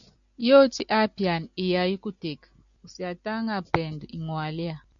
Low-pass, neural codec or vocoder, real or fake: 7.2 kHz; none; real